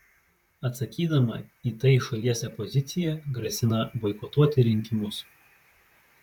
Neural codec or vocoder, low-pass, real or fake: vocoder, 44.1 kHz, 128 mel bands, Pupu-Vocoder; 19.8 kHz; fake